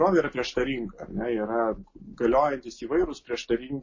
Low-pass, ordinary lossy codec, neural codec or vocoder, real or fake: 7.2 kHz; MP3, 32 kbps; none; real